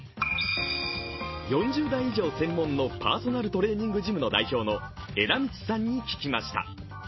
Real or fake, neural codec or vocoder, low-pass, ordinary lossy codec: real; none; 7.2 kHz; MP3, 24 kbps